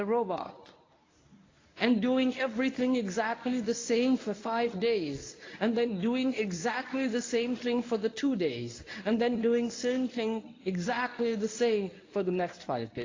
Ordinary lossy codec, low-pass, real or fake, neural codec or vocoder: AAC, 32 kbps; 7.2 kHz; fake; codec, 24 kHz, 0.9 kbps, WavTokenizer, medium speech release version 1